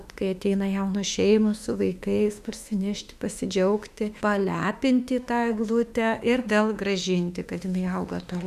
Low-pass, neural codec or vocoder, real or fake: 14.4 kHz; autoencoder, 48 kHz, 32 numbers a frame, DAC-VAE, trained on Japanese speech; fake